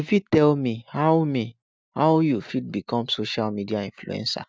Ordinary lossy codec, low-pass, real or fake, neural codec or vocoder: none; none; real; none